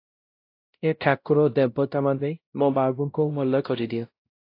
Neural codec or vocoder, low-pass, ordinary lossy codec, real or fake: codec, 16 kHz, 0.5 kbps, X-Codec, WavLM features, trained on Multilingual LibriSpeech; 5.4 kHz; AAC, 32 kbps; fake